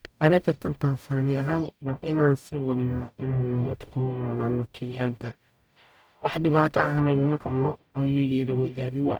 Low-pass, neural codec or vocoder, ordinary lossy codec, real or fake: none; codec, 44.1 kHz, 0.9 kbps, DAC; none; fake